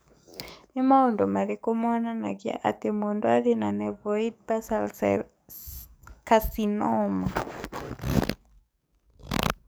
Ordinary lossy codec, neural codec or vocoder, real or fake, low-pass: none; codec, 44.1 kHz, 7.8 kbps, DAC; fake; none